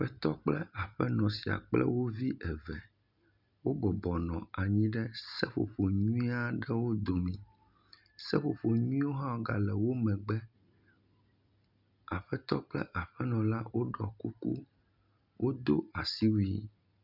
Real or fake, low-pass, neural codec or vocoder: real; 5.4 kHz; none